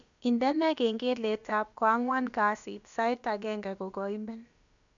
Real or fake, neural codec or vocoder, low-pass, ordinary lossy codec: fake; codec, 16 kHz, about 1 kbps, DyCAST, with the encoder's durations; 7.2 kHz; none